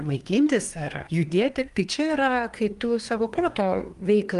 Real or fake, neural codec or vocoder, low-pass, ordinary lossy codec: fake; codec, 24 kHz, 1 kbps, SNAC; 10.8 kHz; Opus, 32 kbps